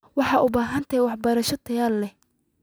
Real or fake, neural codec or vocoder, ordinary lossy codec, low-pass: real; none; none; none